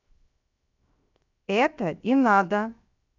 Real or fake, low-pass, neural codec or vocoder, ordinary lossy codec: fake; 7.2 kHz; codec, 16 kHz, 0.7 kbps, FocalCodec; none